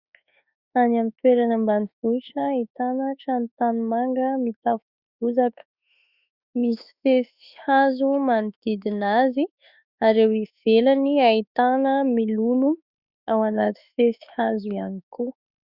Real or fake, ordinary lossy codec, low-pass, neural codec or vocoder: fake; Opus, 64 kbps; 5.4 kHz; autoencoder, 48 kHz, 32 numbers a frame, DAC-VAE, trained on Japanese speech